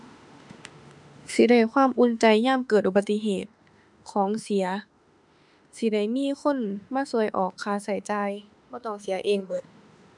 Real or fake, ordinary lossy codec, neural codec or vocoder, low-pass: fake; none; autoencoder, 48 kHz, 32 numbers a frame, DAC-VAE, trained on Japanese speech; 10.8 kHz